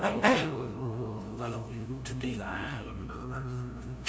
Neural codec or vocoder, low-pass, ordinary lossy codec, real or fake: codec, 16 kHz, 0.5 kbps, FunCodec, trained on LibriTTS, 25 frames a second; none; none; fake